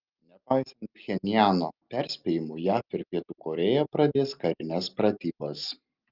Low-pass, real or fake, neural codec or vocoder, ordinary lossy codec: 5.4 kHz; real; none; Opus, 32 kbps